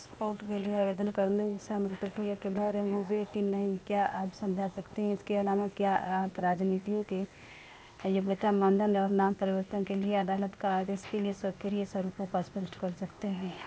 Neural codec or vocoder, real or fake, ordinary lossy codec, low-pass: codec, 16 kHz, 0.8 kbps, ZipCodec; fake; none; none